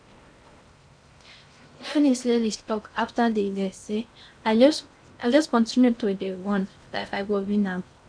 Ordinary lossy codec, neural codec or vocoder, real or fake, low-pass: none; codec, 16 kHz in and 24 kHz out, 0.6 kbps, FocalCodec, streaming, 4096 codes; fake; 9.9 kHz